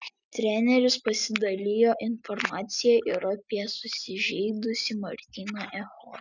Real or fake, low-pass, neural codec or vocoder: real; 7.2 kHz; none